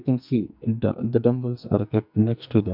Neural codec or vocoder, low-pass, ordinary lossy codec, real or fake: codec, 44.1 kHz, 2.6 kbps, SNAC; 5.4 kHz; none; fake